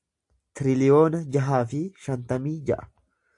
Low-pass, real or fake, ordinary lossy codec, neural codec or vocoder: 10.8 kHz; real; AAC, 64 kbps; none